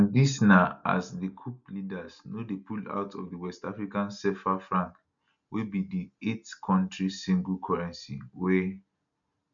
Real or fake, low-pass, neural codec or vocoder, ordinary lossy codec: real; 7.2 kHz; none; MP3, 96 kbps